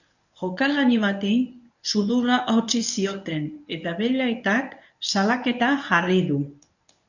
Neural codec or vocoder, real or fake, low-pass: codec, 24 kHz, 0.9 kbps, WavTokenizer, medium speech release version 1; fake; 7.2 kHz